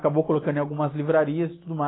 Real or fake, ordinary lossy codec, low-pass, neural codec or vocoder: real; AAC, 16 kbps; 7.2 kHz; none